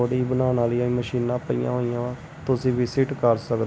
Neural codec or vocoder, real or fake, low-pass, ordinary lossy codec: none; real; none; none